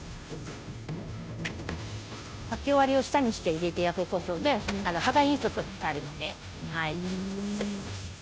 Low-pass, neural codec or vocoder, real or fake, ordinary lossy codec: none; codec, 16 kHz, 0.5 kbps, FunCodec, trained on Chinese and English, 25 frames a second; fake; none